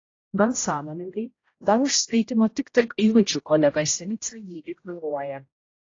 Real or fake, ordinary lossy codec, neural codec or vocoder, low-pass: fake; AAC, 32 kbps; codec, 16 kHz, 0.5 kbps, X-Codec, HuBERT features, trained on general audio; 7.2 kHz